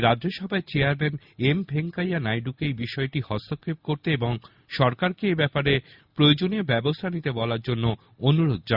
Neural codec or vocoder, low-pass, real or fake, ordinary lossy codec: none; 5.4 kHz; real; Opus, 64 kbps